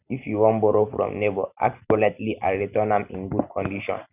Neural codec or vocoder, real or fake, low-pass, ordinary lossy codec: none; real; 3.6 kHz; none